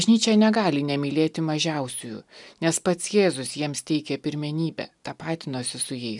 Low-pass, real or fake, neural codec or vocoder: 10.8 kHz; real; none